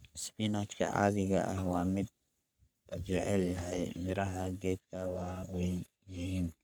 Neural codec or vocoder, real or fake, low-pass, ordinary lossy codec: codec, 44.1 kHz, 3.4 kbps, Pupu-Codec; fake; none; none